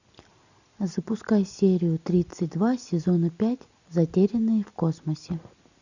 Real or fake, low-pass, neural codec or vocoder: real; 7.2 kHz; none